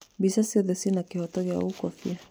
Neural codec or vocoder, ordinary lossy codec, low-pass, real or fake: none; none; none; real